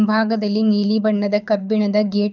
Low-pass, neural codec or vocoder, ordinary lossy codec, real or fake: 7.2 kHz; codec, 24 kHz, 6 kbps, HILCodec; none; fake